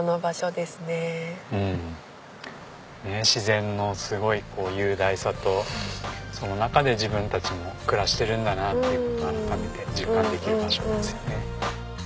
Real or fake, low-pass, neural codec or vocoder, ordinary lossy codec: real; none; none; none